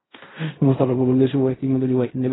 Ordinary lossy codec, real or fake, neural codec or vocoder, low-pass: AAC, 16 kbps; fake; codec, 16 kHz in and 24 kHz out, 0.4 kbps, LongCat-Audio-Codec, fine tuned four codebook decoder; 7.2 kHz